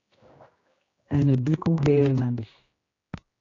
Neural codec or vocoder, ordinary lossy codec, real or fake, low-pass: codec, 16 kHz, 1 kbps, X-Codec, HuBERT features, trained on general audio; AAC, 32 kbps; fake; 7.2 kHz